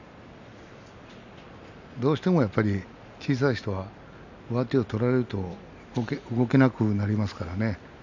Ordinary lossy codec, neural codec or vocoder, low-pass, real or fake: none; none; 7.2 kHz; real